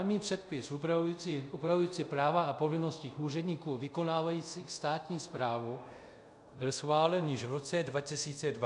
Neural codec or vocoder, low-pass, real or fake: codec, 24 kHz, 0.5 kbps, DualCodec; 10.8 kHz; fake